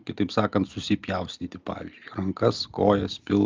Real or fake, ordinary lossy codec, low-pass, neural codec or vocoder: real; Opus, 24 kbps; 7.2 kHz; none